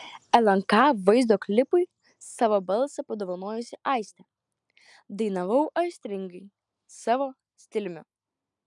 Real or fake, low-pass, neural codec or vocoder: real; 10.8 kHz; none